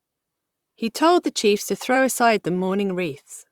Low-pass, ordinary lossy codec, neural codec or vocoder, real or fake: 19.8 kHz; Opus, 64 kbps; vocoder, 44.1 kHz, 128 mel bands, Pupu-Vocoder; fake